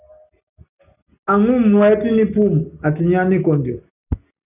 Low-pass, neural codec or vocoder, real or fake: 3.6 kHz; none; real